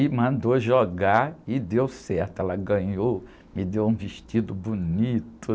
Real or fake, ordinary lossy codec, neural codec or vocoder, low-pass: real; none; none; none